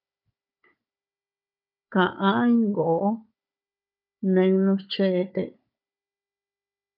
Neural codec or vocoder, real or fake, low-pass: codec, 16 kHz, 4 kbps, FunCodec, trained on Chinese and English, 50 frames a second; fake; 5.4 kHz